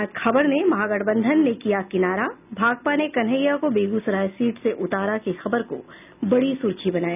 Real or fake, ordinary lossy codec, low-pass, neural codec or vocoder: real; none; 3.6 kHz; none